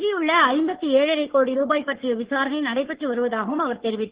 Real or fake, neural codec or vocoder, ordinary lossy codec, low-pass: fake; codec, 44.1 kHz, 3.4 kbps, Pupu-Codec; Opus, 16 kbps; 3.6 kHz